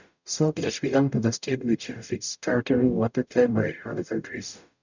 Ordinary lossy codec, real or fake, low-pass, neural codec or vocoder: none; fake; 7.2 kHz; codec, 44.1 kHz, 0.9 kbps, DAC